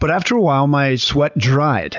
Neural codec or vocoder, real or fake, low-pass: none; real; 7.2 kHz